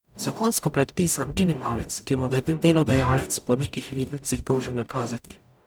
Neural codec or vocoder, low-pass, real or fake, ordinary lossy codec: codec, 44.1 kHz, 0.9 kbps, DAC; none; fake; none